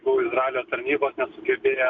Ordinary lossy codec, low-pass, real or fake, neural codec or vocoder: MP3, 64 kbps; 7.2 kHz; real; none